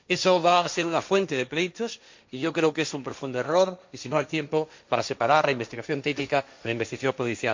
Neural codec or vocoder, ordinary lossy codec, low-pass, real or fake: codec, 16 kHz, 1.1 kbps, Voila-Tokenizer; MP3, 64 kbps; 7.2 kHz; fake